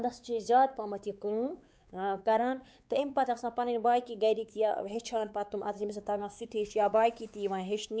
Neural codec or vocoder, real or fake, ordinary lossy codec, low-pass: codec, 16 kHz, 4 kbps, X-Codec, WavLM features, trained on Multilingual LibriSpeech; fake; none; none